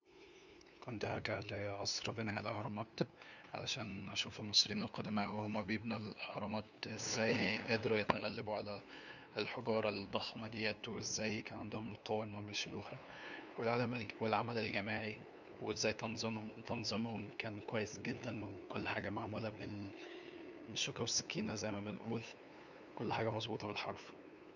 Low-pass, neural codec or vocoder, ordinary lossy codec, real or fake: 7.2 kHz; codec, 16 kHz, 2 kbps, FunCodec, trained on LibriTTS, 25 frames a second; none; fake